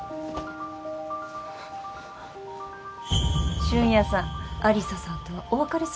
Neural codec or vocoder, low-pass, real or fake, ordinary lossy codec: none; none; real; none